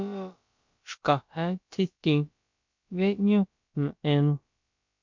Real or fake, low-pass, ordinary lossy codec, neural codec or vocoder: fake; 7.2 kHz; MP3, 48 kbps; codec, 16 kHz, about 1 kbps, DyCAST, with the encoder's durations